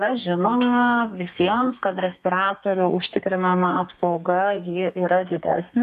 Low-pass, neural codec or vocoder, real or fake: 14.4 kHz; codec, 32 kHz, 1.9 kbps, SNAC; fake